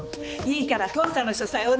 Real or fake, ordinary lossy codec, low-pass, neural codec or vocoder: fake; none; none; codec, 16 kHz, 4 kbps, X-Codec, HuBERT features, trained on balanced general audio